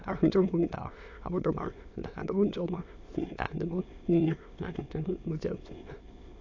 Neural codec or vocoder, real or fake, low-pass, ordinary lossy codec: autoencoder, 22.05 kHz, a latent of 192 numbers a frame, VITS, trained on many speakers; fake; 7.2 kHz; AAC, 48 kbps